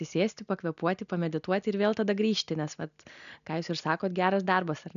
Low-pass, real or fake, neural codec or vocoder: 7.2 kHz; real; none